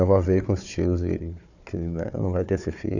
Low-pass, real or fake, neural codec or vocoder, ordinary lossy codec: 7.2 kHz; fake; codec, 16 kHz, 4 kbps, FunCodec, trained on Chinese and English, 50 frames a second; none